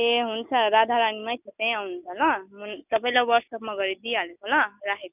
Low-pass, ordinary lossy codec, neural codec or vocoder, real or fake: 3.6 kHz; none; none; real